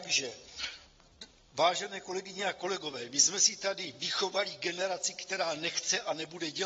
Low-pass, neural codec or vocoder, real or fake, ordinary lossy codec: 7.2 kHz; none; real; AAC, 24 kbps